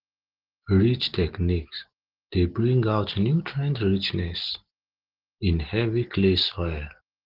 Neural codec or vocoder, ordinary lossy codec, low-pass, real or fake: none; Opus, 16 kbps; 5.4 kHz; real